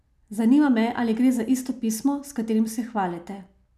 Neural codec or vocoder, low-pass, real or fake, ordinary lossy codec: none; 14.4 kHz; real; none